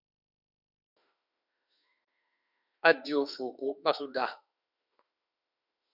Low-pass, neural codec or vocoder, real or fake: 5.4 kHz; autoencoder, 48 kHz, 32 numbers a frame, DAC-VAE, trained on Japanese speech; fake